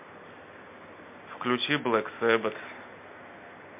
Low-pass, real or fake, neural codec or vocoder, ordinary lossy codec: 3.6 kHz; real; none; MP3, 24 kbps